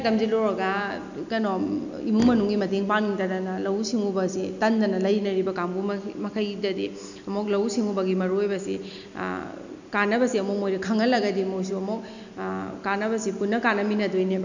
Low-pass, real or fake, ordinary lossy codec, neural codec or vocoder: 7.2 kHz; real; none; none